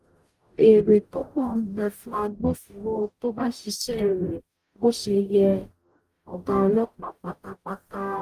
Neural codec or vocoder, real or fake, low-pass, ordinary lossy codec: codec, 44.1 kHz, 0.9 kbps, DAC; fake; 14.4 kHz; Opus, 32 kbps